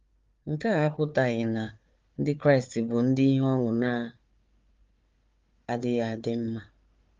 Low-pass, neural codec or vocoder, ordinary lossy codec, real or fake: 7.2 kHz; codec, 16 kHz, 4 kbps, FunCodec, trained on Chinese and English, 50 frames a second; Opus, 32 kbps; fake